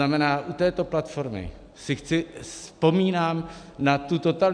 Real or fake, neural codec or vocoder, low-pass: real; none; 9.9 kHz